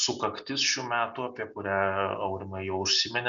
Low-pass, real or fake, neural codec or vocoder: 7.2 kHz; real; none